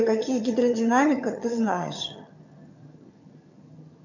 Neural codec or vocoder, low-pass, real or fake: vocoder, 22.05 kHz, 80 mel bands, HiFi-GAN; 7.2 kHz; fake